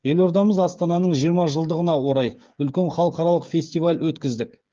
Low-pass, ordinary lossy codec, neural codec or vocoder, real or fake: 7.2 kHz; Opus, 32 kbps; codec, 16 kHz, 8 kbps, FreqCodec, smaller model; fake